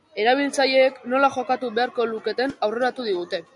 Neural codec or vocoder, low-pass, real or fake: none; 10.8 kHz; real